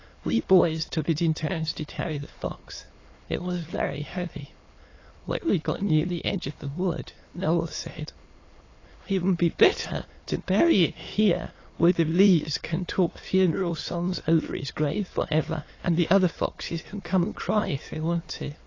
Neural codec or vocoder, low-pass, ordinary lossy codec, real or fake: autoencoder, 22.05 kHz, a latent of 192 numbers a frame, VITS, trained on many speakers; 7.2 kHz; AAC, 32 kbps; fake